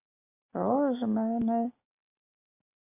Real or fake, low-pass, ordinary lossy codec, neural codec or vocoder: real; 3.6 kHz; AAC, 24 kbps; none